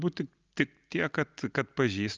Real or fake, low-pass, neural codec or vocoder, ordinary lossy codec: real; 7.2 kHz; none; Opus, 24 kbps